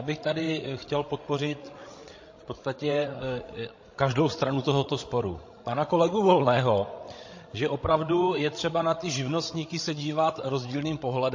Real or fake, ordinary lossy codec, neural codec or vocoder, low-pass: fake; MP3, 32 kbps; codec, 16 kHz, 16 kbps, FreqCodec, larger model; 7.2 kHz